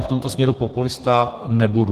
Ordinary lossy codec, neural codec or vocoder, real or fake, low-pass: Opus, 16 kbps; codec, 32 kHz, 1.9 kbps, SNAC; fake; 14.4 kHz